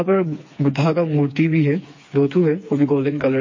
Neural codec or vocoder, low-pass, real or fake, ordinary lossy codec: codec, 16 kHz, 4 kbps, FreqCodec, smaller model; 7.2 kHz; fake; MP3, 32 kbps